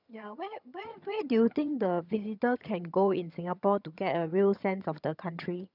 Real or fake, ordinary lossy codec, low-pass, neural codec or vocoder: fake; none; 5.4 kHz; vocoder, 22.05 kHz, 80 mel bands, HiFi-GAN